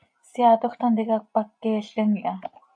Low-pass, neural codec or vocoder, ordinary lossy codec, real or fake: 9.9 kHz; none; AAC, 64 kbps; real